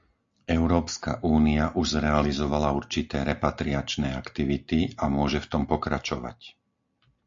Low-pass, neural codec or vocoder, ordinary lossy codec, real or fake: 7.2 kHz; none; AAC, 64 kbps; real